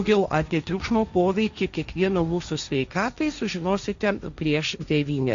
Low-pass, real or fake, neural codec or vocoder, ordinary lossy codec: 7.2 kHz; fake; codec, 16 kHz, 1.1 kbps, Voila-Tokenizer; Opus, 64 kbps